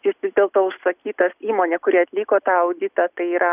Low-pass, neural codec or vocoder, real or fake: 3.6 kHz; none; real